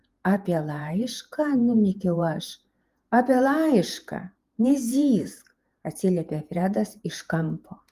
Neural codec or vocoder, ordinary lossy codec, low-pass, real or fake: vocoder, 48 kHz, 128 mel bands, Vocos; Opus, 32 kbps; 14.4 kHz; fake